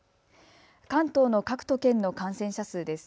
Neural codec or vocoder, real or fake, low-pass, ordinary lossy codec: none; real; none; none